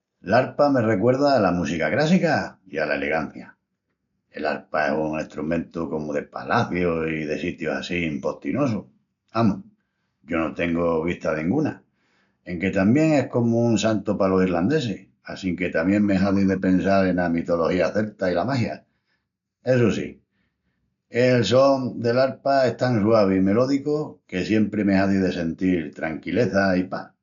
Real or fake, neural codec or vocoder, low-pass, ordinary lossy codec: real; none; 7.2 kHz; none